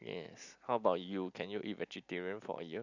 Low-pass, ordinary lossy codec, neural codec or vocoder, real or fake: 7.2 kHz; none; none; real